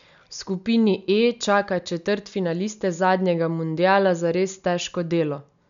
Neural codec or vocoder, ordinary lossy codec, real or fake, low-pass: none; MP3, 96 kbps; real; 7.2 kHz